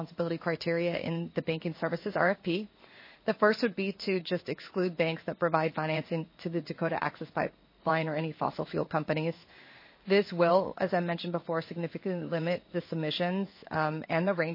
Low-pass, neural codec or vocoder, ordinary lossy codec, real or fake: 5.4 kHz; codec, 16 kHz in and 24 kHz out, 1 kbps, XY-Tokenizer; MP3, 24 kbps; fake